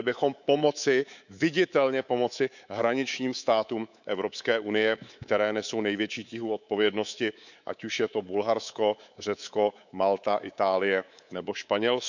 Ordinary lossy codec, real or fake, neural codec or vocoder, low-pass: none; fake; codec, 24 kHz, 3.1 kbps, DualCodec; 7.2 kHz